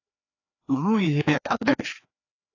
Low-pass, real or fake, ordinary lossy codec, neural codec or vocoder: 7.2 kHz; fake; AAC, 32 kbps; codec, 16 kHz, 2 kbps, FreqCodec, larger model